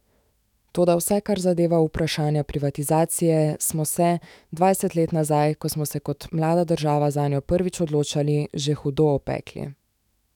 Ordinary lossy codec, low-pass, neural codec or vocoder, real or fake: none; 19.8 kHz; autoencoder, 48 kHz, 128 numbers a frame, DAC-VAE, trained on Japanese speech; fake